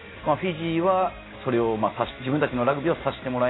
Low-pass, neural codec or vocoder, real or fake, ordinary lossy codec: 7.2 kHz; none; real; AAC, 16 kbps